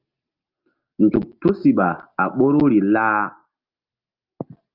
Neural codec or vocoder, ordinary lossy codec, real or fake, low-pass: none; Opus, 24 kbps; real; 5.4 kHz